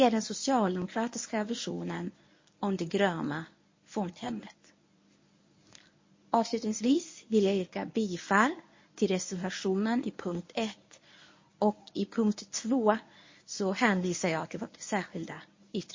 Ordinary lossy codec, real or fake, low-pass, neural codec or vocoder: MP3, 32 kbps; fake; 7.2 kHz; codec, 24 kHz, 0.9 kbps, WavTokenizer, medium speech release version 1